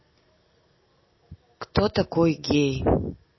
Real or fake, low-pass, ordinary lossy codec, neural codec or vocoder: real; 7.2 kHz; MP3, 24 kbps; none